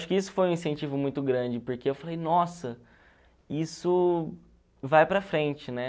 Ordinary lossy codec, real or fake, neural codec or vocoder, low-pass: none; real; none; none